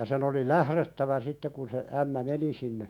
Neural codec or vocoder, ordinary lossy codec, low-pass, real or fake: autoencoder, 48 kHz, 128 numbers a frame, DAC-VAE, trained on Japanese speech; none; 19.8 kHz; fake